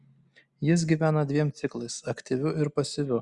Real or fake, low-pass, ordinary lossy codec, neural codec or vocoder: real; 10.8 kHz; Opus, 64 kbps; none